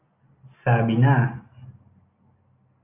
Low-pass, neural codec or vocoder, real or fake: 3.6 kHz; none; real